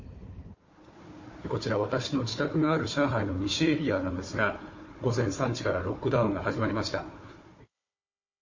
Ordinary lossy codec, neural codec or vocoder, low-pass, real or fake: MP3, 32 kbps; codec, 16 kHz, 4 kbps, FunCodec, trained on Chinese and English, 50 frames a second; 7.2 kHz; fake